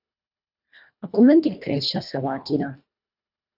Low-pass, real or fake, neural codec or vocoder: 5.4 kHz; fake; codec, 24 kHz, 1.5 kbps, HILCodec